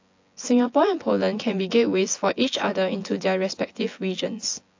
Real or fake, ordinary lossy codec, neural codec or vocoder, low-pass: fake; AAC, 48 kbps; vocoder, 24 kHz, 100 mel bands, Vocos; 7.2 kHz